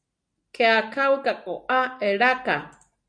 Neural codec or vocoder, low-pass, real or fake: none; 9.9 kHz; real